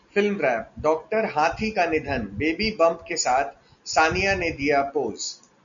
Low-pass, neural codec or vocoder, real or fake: 7.2 kHz; none; real